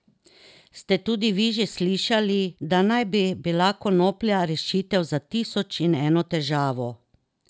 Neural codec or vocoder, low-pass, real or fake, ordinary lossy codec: none; none; real; none